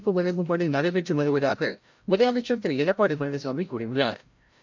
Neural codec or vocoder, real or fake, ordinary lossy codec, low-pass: codec, 16 kHz, 0.5 kbps, FreqCodec, larger model; fake; MP3, 64 kbps; 7.2 kHz